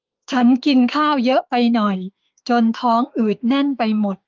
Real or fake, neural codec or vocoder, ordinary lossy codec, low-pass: fake; autoencoder, 48 kHz, 32 numbers a frame, DAC-VAE, trained on Japanese speech; Opus, 32 kbps; 7.2 kHz